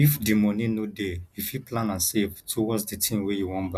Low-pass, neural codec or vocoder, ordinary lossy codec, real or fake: 14.4 kHz; none; none; real